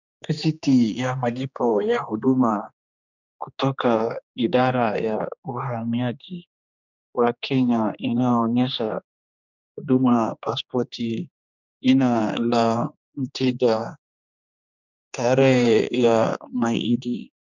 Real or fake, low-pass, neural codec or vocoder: fake; 7.2 kHz; codec, 16 kHz, 2 kbps, X-Codec, HuBERT features, trained on general audio